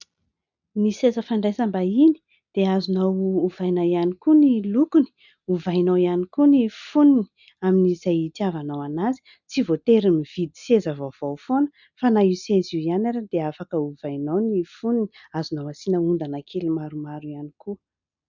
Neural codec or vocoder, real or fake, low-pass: none; real; 7.2 kHz